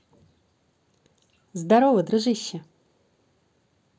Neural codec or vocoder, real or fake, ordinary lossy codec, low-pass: none; real; none; none